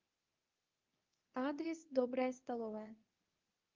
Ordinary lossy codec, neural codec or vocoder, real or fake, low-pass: Opus, 24 kbps; codec, 24 kHz, 0.9 kbps, WavTokenizer, medium speech release version 2; fake; 7.2 kHz